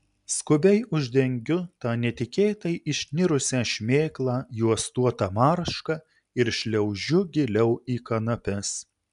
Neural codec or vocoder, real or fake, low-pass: none; real; 10.8 kHz